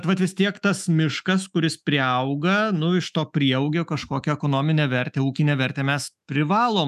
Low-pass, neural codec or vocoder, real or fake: 14.4 kHz; autoencoder, 48 kHz, 128 numbers a frame, DAC-VAE, trained on Japanese speech; fake